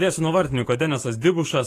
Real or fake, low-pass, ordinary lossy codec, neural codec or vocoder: fake; 14.4 kHz; AAC, 48 kbps; codec, 44.1 kHz, 7.8 kbps, DAC